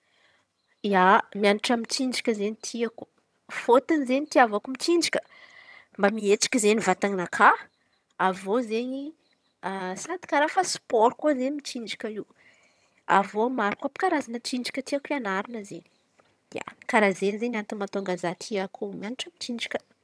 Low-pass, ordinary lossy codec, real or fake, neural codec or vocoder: none; none; fake; vocoder, 22.05 kHz, 80 mel bands, HiFi-GAN